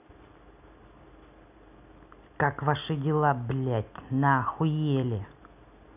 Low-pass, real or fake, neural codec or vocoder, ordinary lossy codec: 3.6 kHz; real; none; none